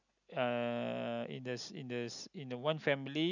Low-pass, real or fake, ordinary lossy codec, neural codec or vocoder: 7.2 kHz; real; none; none